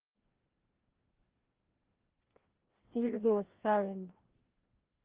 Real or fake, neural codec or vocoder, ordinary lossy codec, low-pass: fake; codec, 16 kHz, 1 kbps, FreqCodec, larger model; Opus, 16 kbps; 3.6 kHz